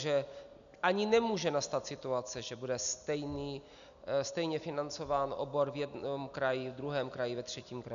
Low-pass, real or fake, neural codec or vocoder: 7.2 kHz; real; none